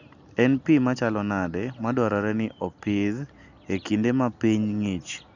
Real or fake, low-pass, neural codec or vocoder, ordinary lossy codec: real; 7.2 kHz; none; none